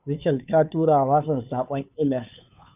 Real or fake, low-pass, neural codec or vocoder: fake; 3.6 kHz; codec, 16 kHz, 2 kbps, FunCodec, trained on Chinese and English, 25 frames a second